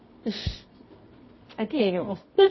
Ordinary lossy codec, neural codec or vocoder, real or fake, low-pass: MP3, 24 kbps; codec, 24 kHz, 0.9 kbps, WavTokenizer, medium music audio release; fake; 7.2 kHz